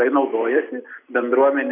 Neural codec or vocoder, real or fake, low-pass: none; real; 3.6 kHz